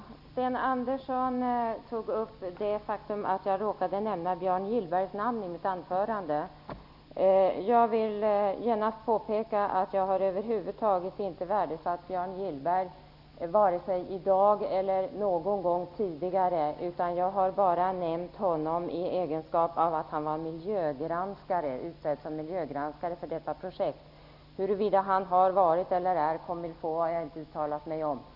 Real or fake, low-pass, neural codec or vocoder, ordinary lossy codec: real; 5.4 kHz; none; none